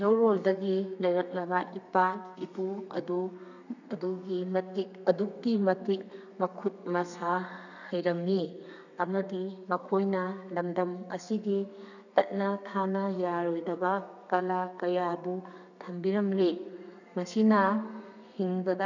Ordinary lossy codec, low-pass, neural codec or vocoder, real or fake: none; 7.2 kHz; codec, 32 kHz, 1.9 kbps, SNAC; fake